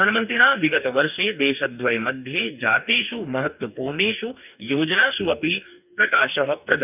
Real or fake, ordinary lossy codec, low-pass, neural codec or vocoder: fake; none; 3.6 kHz; codec, 44.1 kHz, 2.6 kbps, DAC